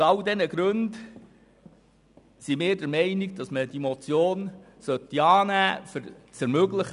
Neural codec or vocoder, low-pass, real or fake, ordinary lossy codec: none; 10.8 kHz; real; none